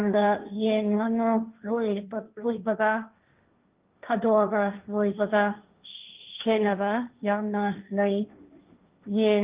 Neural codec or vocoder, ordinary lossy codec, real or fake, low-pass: codec, 16 kHz, 1.1 kbps, Voila-Tokenizer; Opus, 32 kbps; fake; 3.6 kHz